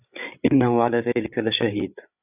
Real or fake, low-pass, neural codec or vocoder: fake; 3.6 kHz; codec, 16 kHz, 8 kbps, FreqCodec, larger model